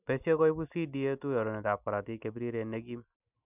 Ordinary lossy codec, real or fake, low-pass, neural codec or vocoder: none; real; 3.6 kHz; none